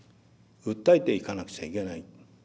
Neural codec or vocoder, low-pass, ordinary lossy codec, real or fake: none; none; none; real